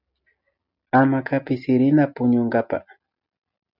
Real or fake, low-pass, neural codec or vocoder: real; 5.4 kHz; none